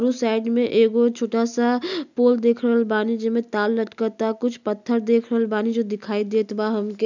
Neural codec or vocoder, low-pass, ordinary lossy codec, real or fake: none; 7.2 kHz; none; real